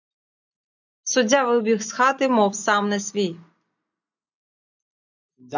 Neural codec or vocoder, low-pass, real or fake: none; 7.2 kHz; real